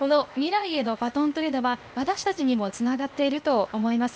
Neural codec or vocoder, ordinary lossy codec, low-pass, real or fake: codec, 16 kHz, 0.8 kbps, ZipCodec; none; none; fake